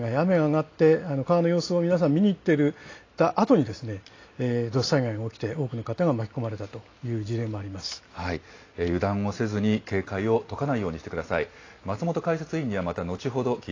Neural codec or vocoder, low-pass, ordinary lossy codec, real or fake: none; 7.2 kHz; AAC, 32 kbps; real